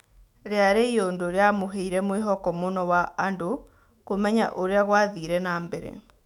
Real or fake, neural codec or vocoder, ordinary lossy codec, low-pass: fake; autoencoder, 48 kHz, 128 numbers a frame, DAC-VAE, trained on Japanese speech; none; 19.8 kHz